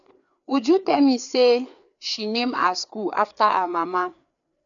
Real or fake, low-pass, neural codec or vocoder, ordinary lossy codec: fake; 7.2 kHz; codec, 16 kHz, 4 kbps, FreqCodec, larger model; none